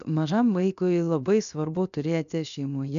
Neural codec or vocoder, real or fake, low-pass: codec, 16 kHz, 0.7 kbps, FocalCodec; fake; 7.2 kHz